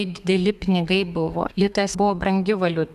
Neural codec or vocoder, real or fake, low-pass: codec, 32 kHz, 1.9 kbps, SNAC; fake; 14.4 kHz